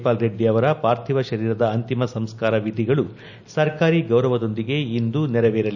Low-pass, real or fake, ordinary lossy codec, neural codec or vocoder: 7.2 kHz; real; none; none